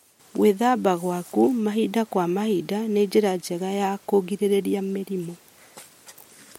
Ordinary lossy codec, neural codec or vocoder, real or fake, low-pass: MP3, 64 kbps; none; real; 19.8 kHz